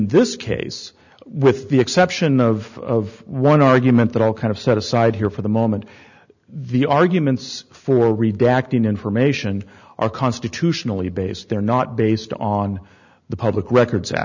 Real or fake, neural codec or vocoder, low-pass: real; none; 7.2 kHz